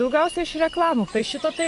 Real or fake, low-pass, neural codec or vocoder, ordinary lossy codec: fake; 10.8 kHz; vocoder, 24 kHz, 100 mel bands, Vocos; MP3, 64 kbps